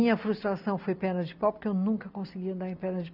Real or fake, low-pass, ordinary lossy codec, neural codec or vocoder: real; 5.4 kHz; none; none